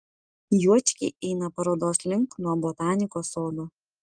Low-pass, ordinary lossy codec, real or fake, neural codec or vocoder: 9.9 kHz; Opus, 24 kbps; real; none